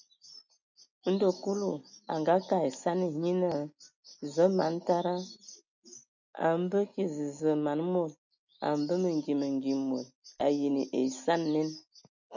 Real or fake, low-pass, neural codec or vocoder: real; 7.2 kHz; none